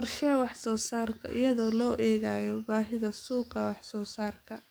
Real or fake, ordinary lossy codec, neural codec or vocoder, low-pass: fake; none; codec, 44.1 kHz, 7.8 kbps, DAC; none